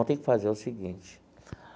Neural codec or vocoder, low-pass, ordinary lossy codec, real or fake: none; none; none; real